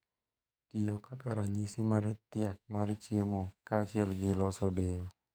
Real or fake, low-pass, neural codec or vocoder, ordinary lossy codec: fake; none; codec, 44.1 kHz, 2.6 kbps, SNAC; none